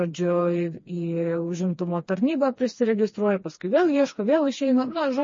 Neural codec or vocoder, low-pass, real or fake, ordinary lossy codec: codec, 16 kHz, 2 kbps, FreqCodec, smaller model; 7.2 kHz; fake; MP3, 32 kbps